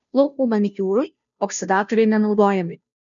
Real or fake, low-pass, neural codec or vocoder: fake; 7.2 kHz; codec, 16 kHz, 0.5 kbps, FunCodec, trained on Chinese and English, 25 frames a second